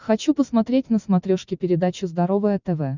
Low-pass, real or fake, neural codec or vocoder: 7.2 kHz; real; none